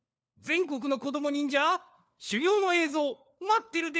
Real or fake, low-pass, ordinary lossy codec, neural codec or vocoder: fake; none; none; codec, 16 kHz, 4 kbps, FunCodec, trained on LibriTTS, 50 frames a second